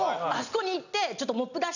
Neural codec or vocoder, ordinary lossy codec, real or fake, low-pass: none; none; real; 7.2 kHz